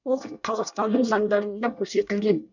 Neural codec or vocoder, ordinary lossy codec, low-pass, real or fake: codec, 24 kHz, 1 kbps, SNAC; none; 7.2 kHz; fake